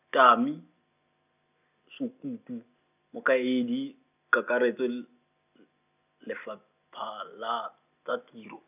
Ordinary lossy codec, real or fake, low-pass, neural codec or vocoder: none; real; 3.6 kHz; none